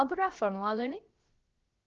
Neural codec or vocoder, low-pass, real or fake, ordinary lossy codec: codec, 16 kHz, about 1 kbps, DyCAST, with the encoder's durations; 7.2 kHz; fake; Opus, 16 kbps